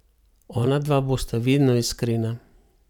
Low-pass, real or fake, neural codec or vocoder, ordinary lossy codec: 19.8 kHz; fake; vocoder, 44.1 kHz, 128 mel bands every 512 samples, BigVGAN v2; none